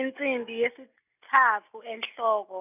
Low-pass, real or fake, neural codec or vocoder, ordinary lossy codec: 3.6 kHz; real; none; none